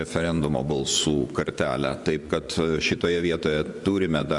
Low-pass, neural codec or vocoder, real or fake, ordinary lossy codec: 10.8 kHz; vocoder, 24 kHz, 100 mel bands, Vocos; fake; Opus, 64 kbps